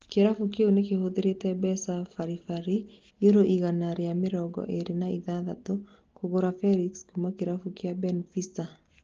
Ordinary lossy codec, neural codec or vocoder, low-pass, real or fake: Opus, 16 kbps; none; 7.2 kHz; real